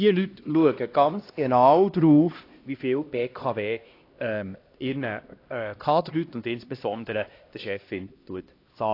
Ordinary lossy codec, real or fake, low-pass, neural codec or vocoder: AAC, 32 kbps; fake; 5.4 kHz; codec, 16 kHz, 1 kbps, X-Codec, HuBERT features, trained on LibriSpeech